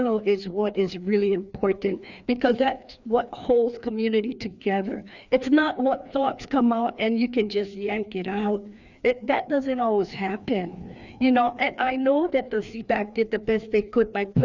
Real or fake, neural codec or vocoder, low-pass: fake; codec, 16 kHz, 2 kbps, FreqCodec, larger model; 7.2 kHz